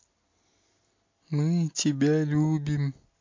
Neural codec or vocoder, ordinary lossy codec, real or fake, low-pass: none; MP3, 48 kbps; real; 7.2 kHz